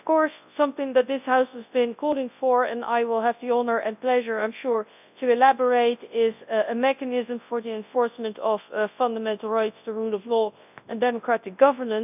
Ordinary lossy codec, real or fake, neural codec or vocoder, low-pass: none; fake; codec, 24 kHz, 0.9 kbps, WavTokenizer, large speech release; 3.6 kHz